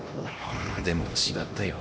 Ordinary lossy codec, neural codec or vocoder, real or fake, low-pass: none; codec, 16 kHz, 1 kbps, X-Codec, HuBERT features, trained on LibriSpeech; fake; none